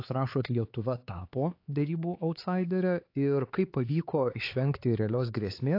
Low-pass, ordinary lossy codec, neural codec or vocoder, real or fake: 5.4 kHz; AAC, 32 kbps; codec, 16 kHz, 4 kbps, X-Codec, HuBERT features, trained on LibriSpeech; fake